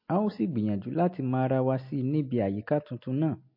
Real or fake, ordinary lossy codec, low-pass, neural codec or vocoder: real; MP3, 32 kbps; 5.4 kHz; none